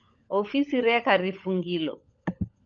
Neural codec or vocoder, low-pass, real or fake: codec, 16 kHz, 16 kbps, FunCodec, trained on LibriTTS, 50 frames a second; 7.2 kHz; fake